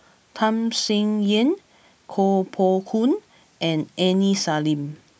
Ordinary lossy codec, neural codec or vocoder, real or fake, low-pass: none; none; real; none